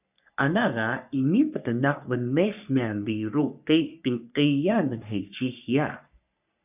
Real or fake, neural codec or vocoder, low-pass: fake; codec, 44.1 kHz, 3.4 kbps, Pupu-Codec; 3.6 kHz